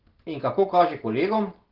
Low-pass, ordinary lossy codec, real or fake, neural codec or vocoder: 5.4 kHz; Opus, 16 kbps; real; none